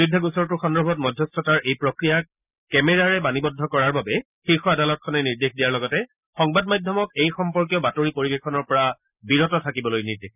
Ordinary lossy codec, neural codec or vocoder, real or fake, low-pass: none; none; real; 3.6 kHz